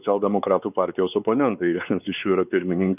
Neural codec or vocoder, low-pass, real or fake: codec, 16 kHz, 4 kbps, X-Codec, WavLM features, trained on Multilingual LibriSpeech; 3.6 kHz; fake